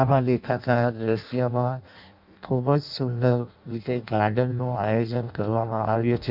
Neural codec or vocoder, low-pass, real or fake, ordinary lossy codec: codec, 16 kHz in and 24 kHz out, 0.6 kbps, FireRedTTS-2 codec; 5.4 kHz; fake; none